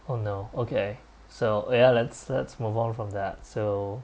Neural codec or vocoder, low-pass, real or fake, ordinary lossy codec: none; none; real; none